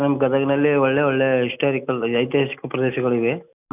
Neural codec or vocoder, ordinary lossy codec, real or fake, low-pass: none; none; real; 3.6 kHz